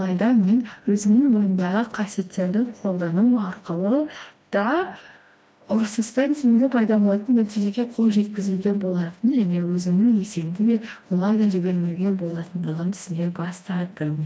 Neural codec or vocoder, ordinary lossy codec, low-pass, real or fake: codec, 16 kHz, 1 kbps, FreqCodec, smaller model; none; none; fake